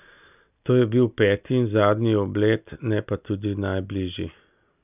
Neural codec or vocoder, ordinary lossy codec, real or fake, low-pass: none; none; real; 3.6 kHz